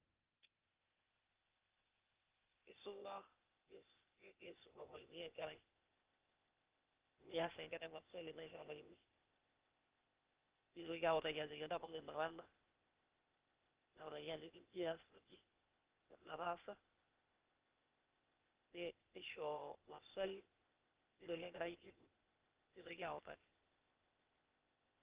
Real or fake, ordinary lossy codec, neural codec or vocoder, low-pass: fake; Opus, 32 kbps; codec, 16 kHz, 0.8 kbps, ZipCodec; 3.6 kHz